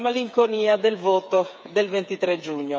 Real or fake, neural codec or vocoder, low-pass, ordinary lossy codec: fake; codec, 16 kHz, 8 kbps, FreqCodec, smaller model; none; none